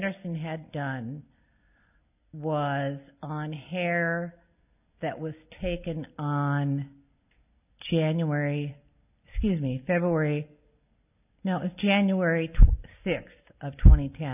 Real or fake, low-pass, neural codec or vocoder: real; 3.6 kHz; none